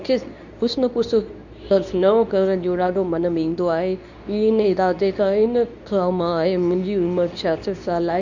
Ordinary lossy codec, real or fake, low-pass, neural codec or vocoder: none; fake; 7.2 kHz; codec, 24 kHz, 0.9 kbps, WavTokenizer, medium speech release version 2